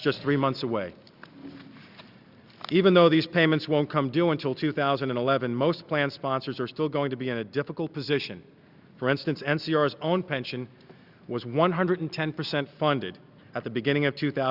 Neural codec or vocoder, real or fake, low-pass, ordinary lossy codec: none; real; 5.4 kHz; Opus, 64 kbps